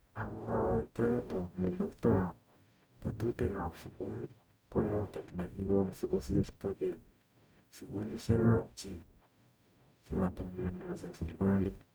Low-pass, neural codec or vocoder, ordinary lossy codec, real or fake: none; codec, 44.1 kHz, 0.9 kbps, DAC; none; fake